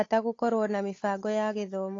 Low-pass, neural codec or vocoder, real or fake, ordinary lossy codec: 7.2 kHz; codec, 16 kHz, 8 kbps, FunCodec, trained on Chinese and English, 25 frames a second; fake; AAC, 32 kbps